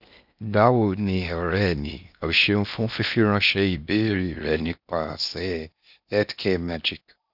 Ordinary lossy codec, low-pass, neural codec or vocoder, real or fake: none; 5.4 kHz; codec, 16 kHz in and 24 kHz out, 0.6 kbps, FocalCodec, streaming, 4096 codes; fake